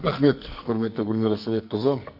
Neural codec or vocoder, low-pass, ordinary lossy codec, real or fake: codec, 44.1 kHz, 2.6 kbps, SNAC; 5.4 kHz; none; fake